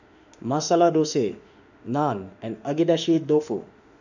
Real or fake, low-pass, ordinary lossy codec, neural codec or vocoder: fake; 7.2 kHz; none; autoencoder, 48 kHz, 32 numbers a frame, DAC-VAE, trained on Japanese speech